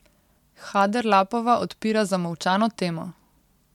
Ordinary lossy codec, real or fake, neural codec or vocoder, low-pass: MP3, 96 kbps; real; none; 19.8 kHz